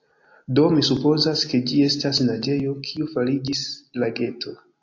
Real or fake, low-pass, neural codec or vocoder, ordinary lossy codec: real; 7.2 kHz; none; AAC, 48 kbps